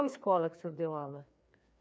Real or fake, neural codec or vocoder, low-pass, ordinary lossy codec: fake; codec, 16 kHz, 2 kbps, FreqCodec, larger model; none; none